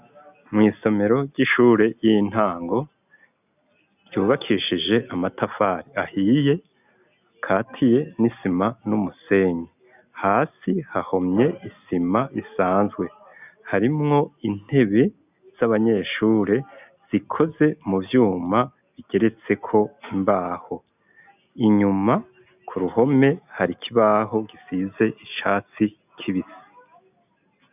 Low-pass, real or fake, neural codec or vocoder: 3.6 kHz; real; none